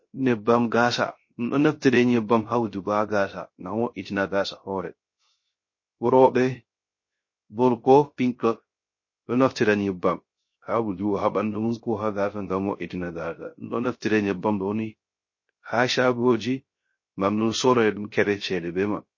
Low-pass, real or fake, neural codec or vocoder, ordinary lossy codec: 7.2 kHz; fake; codec, 16 kHz, 0.3 kbps, FocalCodec; MP3, 32 kbps